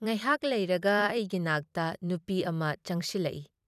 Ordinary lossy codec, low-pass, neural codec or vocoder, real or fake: none; 14.4 kHz; vocoder, 44.1 kHz, 128 mel bands every 256 samples, BigVGAN v2; fake